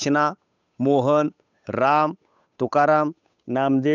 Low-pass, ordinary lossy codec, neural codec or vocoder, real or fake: 7.2 kHz; none; codec, 16 kHz, 8 kbps, FunCodec, trained on Chinese and English, 25 frames a second; fake